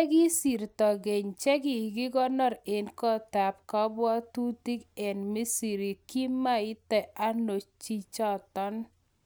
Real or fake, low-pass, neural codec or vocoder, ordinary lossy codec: real; none; none; none